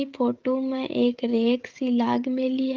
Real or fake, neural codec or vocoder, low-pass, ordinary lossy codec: fake; codec, 16 kHz, 16 kbps, FreqCodec, smaller model; 7.2 kHz; Opus, 32 kbps